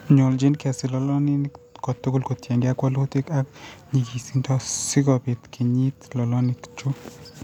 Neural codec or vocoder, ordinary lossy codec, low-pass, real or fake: none; none; 19.8 kHz; real